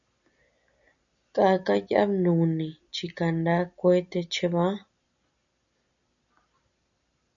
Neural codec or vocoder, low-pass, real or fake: none; 7.2 kHz; real